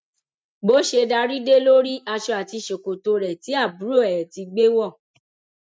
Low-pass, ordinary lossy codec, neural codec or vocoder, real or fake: none; none; none; real